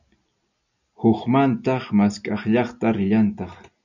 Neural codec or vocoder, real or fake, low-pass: none; real; 7.2 kHz